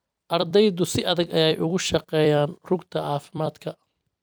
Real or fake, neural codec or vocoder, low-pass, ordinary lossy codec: fake; vocoder, 44.1 kHz, 128 mel bands every 256 samples, BigVGAN v2; none; none